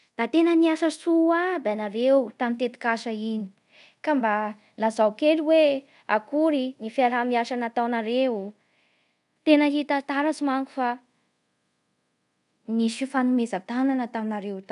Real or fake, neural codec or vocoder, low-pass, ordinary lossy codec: fake; codec, 24 kHz, 0.5 kbps, DualCodec; 10.8 kHz; none